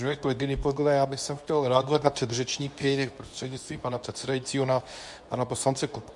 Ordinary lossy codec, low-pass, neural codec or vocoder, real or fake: MP3, 64 kbps; 10.8 kHz; codec, 24 kHz, 0.9 kbps, WavTokenizer, medium speech release version 2; fake